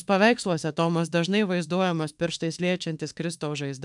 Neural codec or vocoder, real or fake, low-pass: autoencoder, 48 kHz, 32 numbers a frame, DAC-VAE, trained on Japanese speech; fake; 10.8 kHz